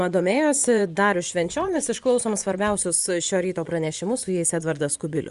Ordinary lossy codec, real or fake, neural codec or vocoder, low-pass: Opus, 64 kbps; fake; vocoder, 24 kHz, 100 mel bands, Vocos; 10.8 kHz